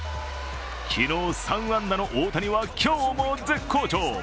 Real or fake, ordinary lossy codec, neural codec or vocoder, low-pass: real; none; none; none